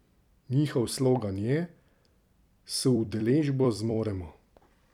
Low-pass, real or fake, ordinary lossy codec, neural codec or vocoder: 19.8 kHz; fake; none; vocoder, 44.1 kHz, 128 mel bands every 256 samples, BigVGAN v2